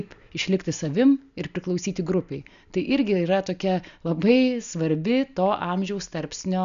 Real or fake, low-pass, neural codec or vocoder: real; 7.2 kHz; none